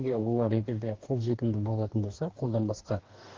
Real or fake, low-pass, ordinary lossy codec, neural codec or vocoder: fake; 7.2 kHz; Opus, 16 kbps; codec, 44.1 kHz, 2.6 kbps, DAC